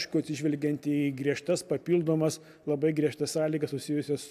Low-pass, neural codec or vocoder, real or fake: 14.4 kHz; none; real